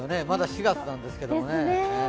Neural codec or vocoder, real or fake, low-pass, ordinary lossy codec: none; real; none; none